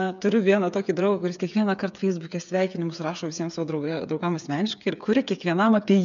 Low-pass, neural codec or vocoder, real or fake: 7.2 kHz; codec, 16 kHz, 8 kbps, FreqCodec, smaller model; fake